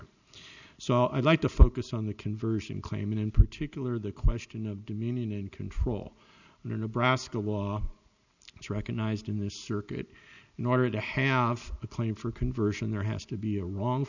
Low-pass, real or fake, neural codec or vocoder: 7.2 kHz; real; none